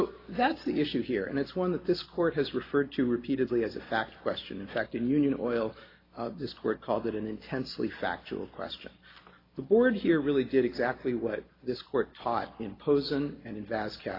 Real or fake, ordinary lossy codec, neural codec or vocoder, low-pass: real; AAC, 24 kbps; none; 5.4 kHz